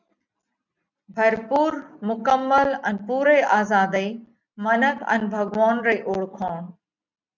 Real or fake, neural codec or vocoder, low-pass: real; none; 7.2 kHz